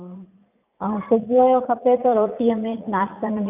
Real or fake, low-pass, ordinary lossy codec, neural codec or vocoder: fake; 3.6 kHz; none; vocoder, 44.1 kHz, 80 mel bands, Vocos